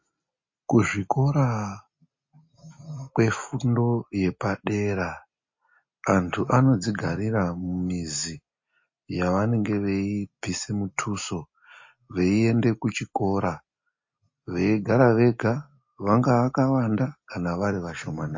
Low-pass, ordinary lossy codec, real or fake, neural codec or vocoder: 7.2 kHz; MP3, 32 kbps; real; none